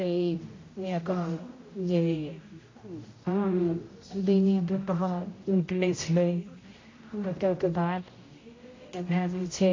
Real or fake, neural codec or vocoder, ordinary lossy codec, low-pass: fake; codec, 16 kHz, 0.5 kbps, X-Codec, HuBERT features, trained on general audio; AAC, 32 kbps; 7.2 kHz